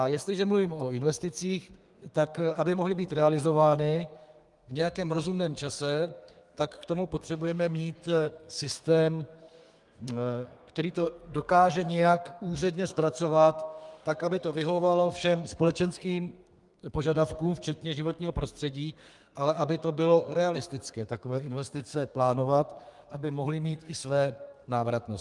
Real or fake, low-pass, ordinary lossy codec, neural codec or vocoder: fake; 10.8 kHz; Opus, 32 kbps; codec, 32 kHz, 1.9 kbps, SNAC